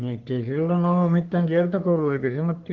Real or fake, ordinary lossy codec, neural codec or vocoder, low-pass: fake; Opus, 16 kbps; codec, 44.1 kHz, 3.4 kbps, Pupu-Codec; 7.2 kHz